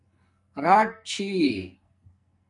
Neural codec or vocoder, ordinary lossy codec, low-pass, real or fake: codec, 44.1 kHz, 2.6 kbps, SNAC; MP3, 96 kbps; 10.8 kHz; fake